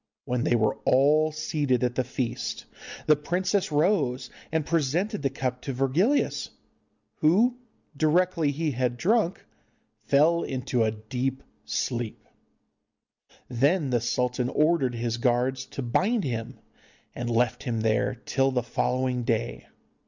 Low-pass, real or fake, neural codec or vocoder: 7.2 kHz; real; none